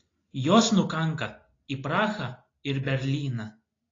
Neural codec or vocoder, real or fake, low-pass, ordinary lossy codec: none; real; 7.2 kHz; AAC, 32 kbps